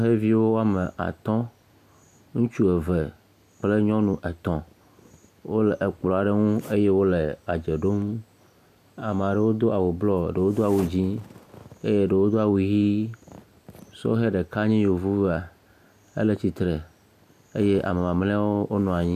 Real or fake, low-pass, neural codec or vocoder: real; 14.4 kHz; none